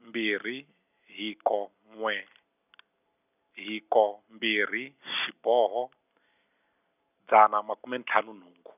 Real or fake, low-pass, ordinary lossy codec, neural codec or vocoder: real; 3.6 kHz; none; none